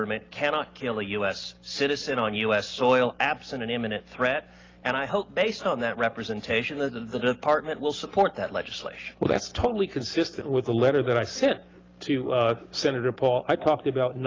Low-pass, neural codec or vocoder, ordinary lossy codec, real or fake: 7.2 kHz; none; Opus, 24 kbps; real